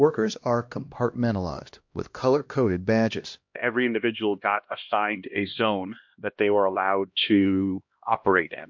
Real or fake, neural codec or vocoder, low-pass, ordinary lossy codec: fake; codec, 16 kHz, 1 kbps, X-Codec, HuBERT features, trained on LibriSpeech; 7.2 kHz; MP3, 48 kbps